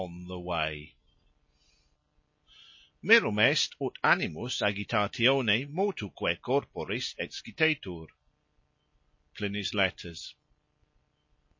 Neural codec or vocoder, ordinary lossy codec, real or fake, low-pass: none; MP3, 32 kbps; real; 7.2 kHz